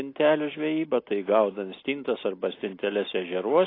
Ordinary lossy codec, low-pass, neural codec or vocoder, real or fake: AAC, 24 kbps; 5.4 kHz; none; real